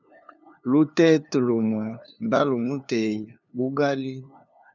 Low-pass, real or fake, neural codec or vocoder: 7.2 kHz; fake; codec, 16 kHz, 2 kbps, FunCodec, trained on LibriTTS, 25 frames a second